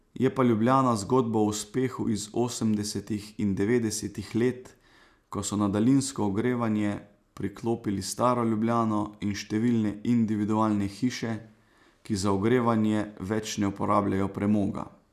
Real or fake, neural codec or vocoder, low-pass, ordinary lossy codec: real; none; 14.4 kHz; none